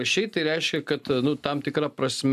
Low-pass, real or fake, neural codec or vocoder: 14.4 kHz; real; none